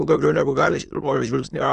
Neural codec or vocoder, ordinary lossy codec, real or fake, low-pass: autoencoder, 22.05 kHz, a latent of 192 numbers a frame, VITS, trained on many speakers; AAC, 96 kbps; fake; 9.9 kHz